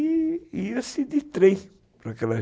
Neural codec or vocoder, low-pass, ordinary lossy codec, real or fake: none; none; none; real